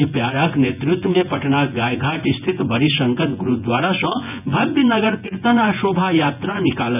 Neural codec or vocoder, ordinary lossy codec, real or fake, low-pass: vocoder, 24 kHz, 100 mel bands, Vocos; none; fake; 3.6 kHz